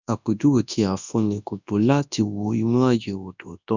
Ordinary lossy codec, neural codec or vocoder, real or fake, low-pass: none; codec, 24 kHz, 0.9 kbps, WavTokenizer, large speech release; fake; 7.2 kHz